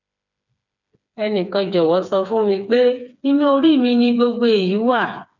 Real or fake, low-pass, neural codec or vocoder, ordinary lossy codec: fake; 7.2 kHz; codec, 16 kHz, 4 kbps, FreqCodec, smaller model; none